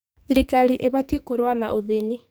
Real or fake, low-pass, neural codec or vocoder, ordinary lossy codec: fake; none; codec, 44.1 kHz, 2.6 kbps, SNAC; none